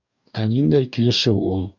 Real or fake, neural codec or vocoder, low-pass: fake; codec, 44.1 kHz, 2.6 kbps, DAC; 7.2 kHz